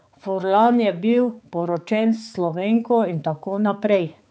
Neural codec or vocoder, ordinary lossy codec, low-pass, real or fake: codec, 16 kHz, 4 kbps, X-Codec, HuBERT features, trained on balanced general audio; none; none; fake